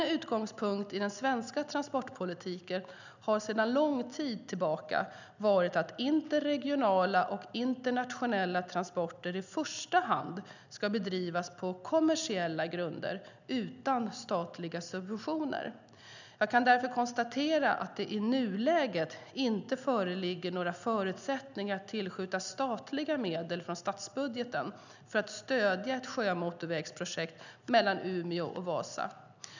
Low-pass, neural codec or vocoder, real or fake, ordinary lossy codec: 7.2 kHz; none; real; none